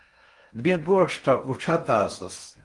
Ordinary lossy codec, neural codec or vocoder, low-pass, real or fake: Opus, 32 kbps; codec, 16 kHz in and 24 kHz out, 0.6 kbps, FocalCodec, streaming, 4096 codes; 10.8 kHz; fake